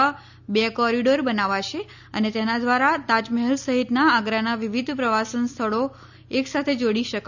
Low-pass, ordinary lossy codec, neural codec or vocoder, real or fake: 7.2 kHz; none; none; real